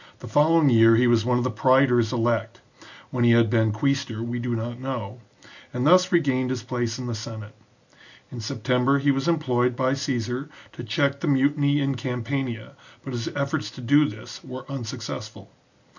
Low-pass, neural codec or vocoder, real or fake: 7.2 kHz; none; real